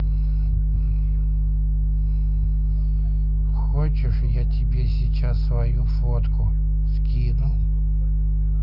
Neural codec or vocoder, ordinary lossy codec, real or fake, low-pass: none; none; real; 5.4 kHz